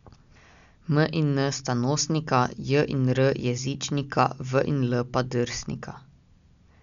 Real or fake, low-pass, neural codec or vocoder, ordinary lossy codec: real; 7.2 kHz; none; none